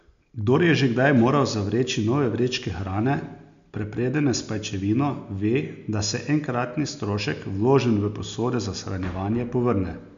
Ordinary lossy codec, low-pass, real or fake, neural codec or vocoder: MP3, 64 kbps; 7.2 kHz; real; none